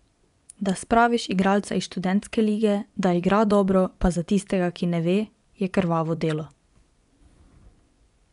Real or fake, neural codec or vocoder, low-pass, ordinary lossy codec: fake; vocoder, 24 kHz, 100 mel bands, Vocos; 10.8 kHz; none